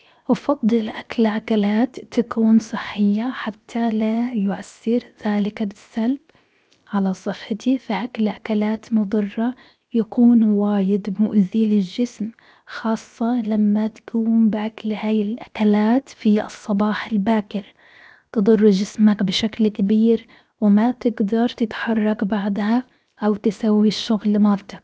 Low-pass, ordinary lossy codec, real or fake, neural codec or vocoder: none; none; fake; codec, 16 kHz, 0.7 kbps, FocalCodec